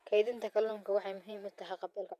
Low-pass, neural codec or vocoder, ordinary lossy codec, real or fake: 14.4 kHz; vocoder, 48 kHz, 128 mel bands, Vocos; none; fake